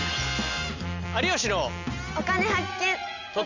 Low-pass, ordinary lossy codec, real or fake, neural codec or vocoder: 7.2 kHz; none; real; none